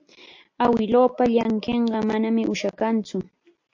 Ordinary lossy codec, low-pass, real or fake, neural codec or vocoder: MP3, 48 kbps; 7.2 kHz; real; none